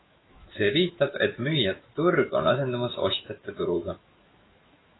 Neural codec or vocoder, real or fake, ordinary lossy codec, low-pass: autoencoder, 48 kHz, 128 numbers a frame, DAC-VAE, trained on Japanese speech; fake; AAC, 16 kbps; 7.2 kHz